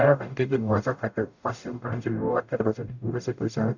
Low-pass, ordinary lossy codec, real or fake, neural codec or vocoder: 7.2 kHz; none; fake; codec, 44.1 kHz, 0.9 kbps, DAC